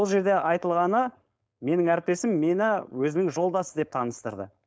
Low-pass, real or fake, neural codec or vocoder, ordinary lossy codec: none; fake; codec, 16 kHz, 4.8 kbps, FACodec; none